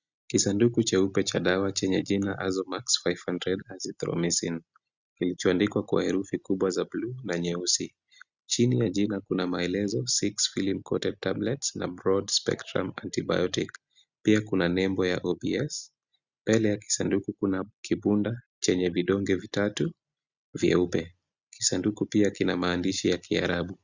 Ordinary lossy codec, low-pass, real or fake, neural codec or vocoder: Opus, 64 kbps; 7.2 kHz; real; none